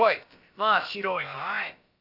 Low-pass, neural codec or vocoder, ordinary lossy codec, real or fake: 5.4 kHz; codec, 16 kHz, about 1 kbps, DyCAST, with the encoder's durations; none; fake